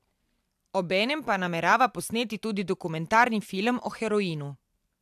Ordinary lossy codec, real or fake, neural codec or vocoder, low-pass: AAC, 96 kbps; real; none; 14.4 kHz